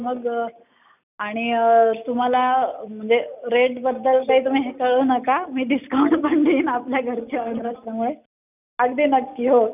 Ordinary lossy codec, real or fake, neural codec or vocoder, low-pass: none; real; none; 3.6 kHz